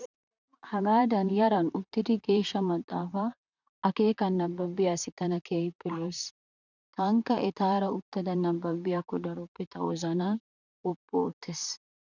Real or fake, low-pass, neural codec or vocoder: fake; 7.2 kHz; vocoder, 44.1 kHz, 128 mel bands, Pupu-Vocoder